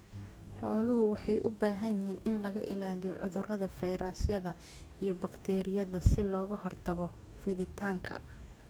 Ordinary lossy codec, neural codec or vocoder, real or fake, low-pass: none; codec, 44.1 kHz, 2.6 kbps, DAC; fake; none